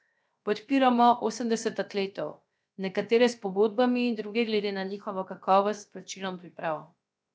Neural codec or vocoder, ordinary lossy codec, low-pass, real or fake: codec, 16 kHz, 0.7 kbps, FocalCodec; none; none; fake